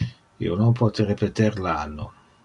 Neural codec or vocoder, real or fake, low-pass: none; real; 10.8 kHz